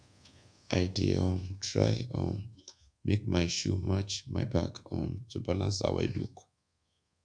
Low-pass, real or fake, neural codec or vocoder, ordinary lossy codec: 9.9 kHz; fake; codec, 24 kHz, 1.2 kbps, DualCodec; none